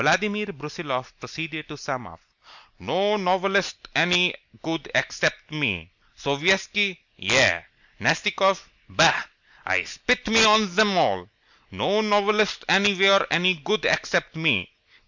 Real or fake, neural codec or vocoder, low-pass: real; none; 7.2 kHz